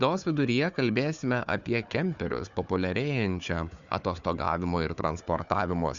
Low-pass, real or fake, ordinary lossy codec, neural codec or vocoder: 7.2 kHz; fake; Opus, 64 kbps; codec, 16 kHz, 4 kbps, FunCodec, trained on Chinese and English, 50 frames a second